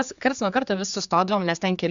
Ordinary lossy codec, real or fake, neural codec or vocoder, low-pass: Opus, 64 kbps; fake; codec, 16 kHz, 4 kbps, X-Codec, HuBERT features, trained on general audio; 7.2 kHz